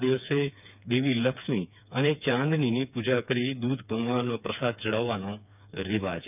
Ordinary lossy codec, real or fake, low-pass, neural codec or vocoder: none; fake; 3.6 kHz; codec, 16 kHz, 4 kbps, FreqCodec, smaller model